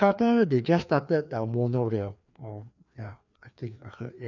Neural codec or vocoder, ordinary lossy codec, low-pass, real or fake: codec, 16 kHz, 2 kbps, FreqCodec, larger model; none; 7.2 kHz; fake